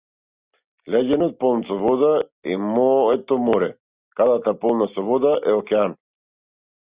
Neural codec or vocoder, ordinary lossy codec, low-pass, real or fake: none; Opus, 64 kbps; 3.6 kHz; real